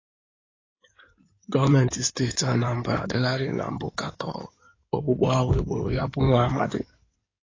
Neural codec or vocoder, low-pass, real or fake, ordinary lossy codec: codec, 16 kHz in and 24 kHz out, 2.2 kbps, FireRedTTS-2 codec; 7.2 kHz; fake; AAC, 32 kbps